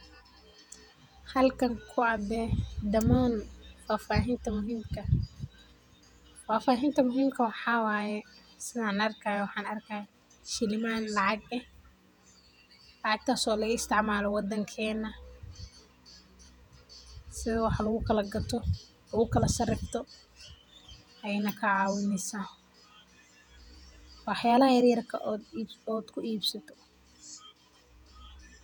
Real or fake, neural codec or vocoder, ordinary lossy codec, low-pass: fake; vocoder, 48 kHz, 128 mel bands, Vocos; none; 19.8 kHz